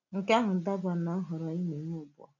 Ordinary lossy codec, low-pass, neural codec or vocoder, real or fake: none; 7.2 kHz; none; real